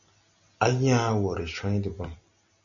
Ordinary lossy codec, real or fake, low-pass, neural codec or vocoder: MP3, 96 kbps; real; 7.2 kHz; none